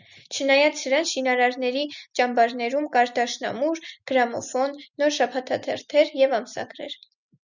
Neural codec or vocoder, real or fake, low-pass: none; real; 7.2 kHz